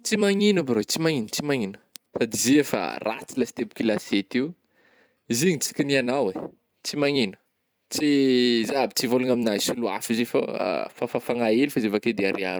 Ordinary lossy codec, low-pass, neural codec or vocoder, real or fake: none; none; vocoder, 44.1 kHz, 128 mel bands every 256 samples, BigVGAN v2; fake